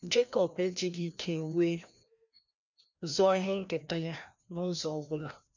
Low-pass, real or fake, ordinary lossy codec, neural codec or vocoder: 7.2 kHz; fake; none; codec, 16 kHz, 1 kbps, FreqCodec, larger model